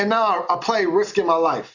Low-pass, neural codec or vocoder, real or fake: 7.2 kHz; none; real